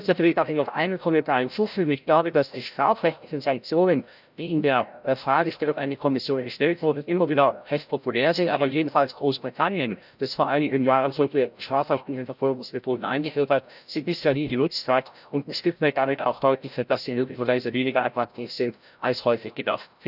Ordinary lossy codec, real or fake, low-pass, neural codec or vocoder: none; fake; 5.4 kHz; codec, 16 kHz, 0.5 kbps, FreqCodec, larger model